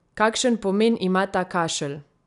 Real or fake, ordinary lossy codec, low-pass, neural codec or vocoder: real; none; 10.8 kHz; none